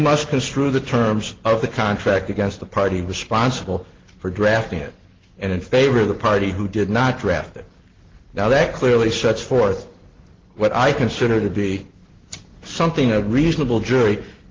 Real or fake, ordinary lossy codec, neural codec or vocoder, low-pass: real; Opus, 16 kbps; none; 7.2 kHz